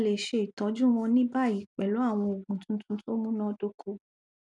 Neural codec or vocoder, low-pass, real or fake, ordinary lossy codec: none; 10.8 kHz; real; none